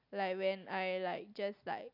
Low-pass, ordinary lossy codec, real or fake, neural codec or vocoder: 5.4 kHz; none; real; none